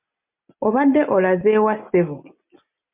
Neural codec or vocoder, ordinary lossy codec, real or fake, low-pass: none; MP3, 32 kbps; real; 3.6 kHz